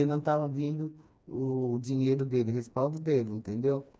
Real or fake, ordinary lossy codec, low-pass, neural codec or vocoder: fake; none; none; codec, 16 kHz, 2 kbps, FreqCodec, smaller model